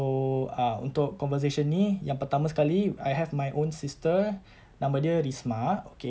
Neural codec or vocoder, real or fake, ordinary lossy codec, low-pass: none; real; none; none